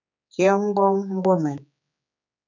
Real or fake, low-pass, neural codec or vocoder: fake; 7.2 kHz; codec, 16 kHz, 4 kbps, X-Codec, HuBERT features, trained on general audio